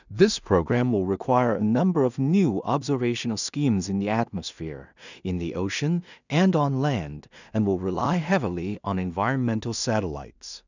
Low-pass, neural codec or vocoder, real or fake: 7.2 kHz; codec, 16 kHz in and 24 kHz out, 0.4 kbps, LongCat-Audio-Codec, two codebook decoder; fake